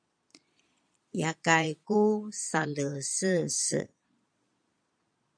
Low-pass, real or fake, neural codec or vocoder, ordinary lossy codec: 9.9 kHz; fake; vocoder, 22.05 kHz, 80 mel bands, Vocos; MP3, 64 kbps